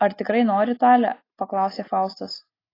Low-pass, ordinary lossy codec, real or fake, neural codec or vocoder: 5.4 kHz; AAC, 24 kbps; real; none